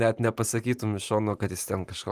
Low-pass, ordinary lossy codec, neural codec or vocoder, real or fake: 14.4 kHz; Opus, 32 kbps; vocoder, 44.1 kHz, 128 mel bands every 512 samples, BigVGAN v2; fake